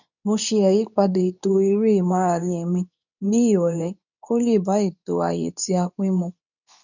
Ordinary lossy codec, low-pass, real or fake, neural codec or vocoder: none; 7.2 kHz; fake; codec, 24 kHz, 0.9 kbps, WavTokenizer, medium speech release version 2